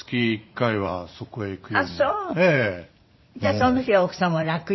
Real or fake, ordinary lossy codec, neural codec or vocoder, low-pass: fake; MP3, 24 kbps; vocoder, 44.1 kHz, 80 mel bands, Vocos; 7.2 kHz